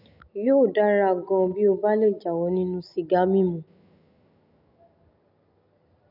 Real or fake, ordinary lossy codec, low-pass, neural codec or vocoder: real; none; 5.4 kHz; none